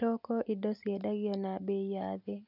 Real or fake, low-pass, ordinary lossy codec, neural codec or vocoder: real; 5.4 kHz; none; none